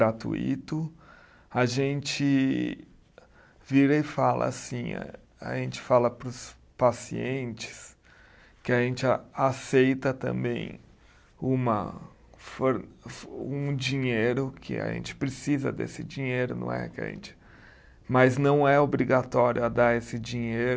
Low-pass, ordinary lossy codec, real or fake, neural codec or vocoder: none; none; real; none